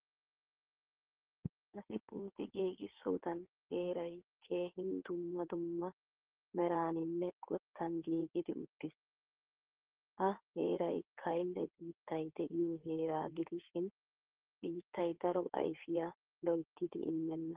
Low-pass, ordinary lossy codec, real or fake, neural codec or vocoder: 3.6 kHz; Opus, 16 kbps; fake; codec, 16 kHz in and 24 kHz out, 2.2 kbps, FireRedTTS-2 codec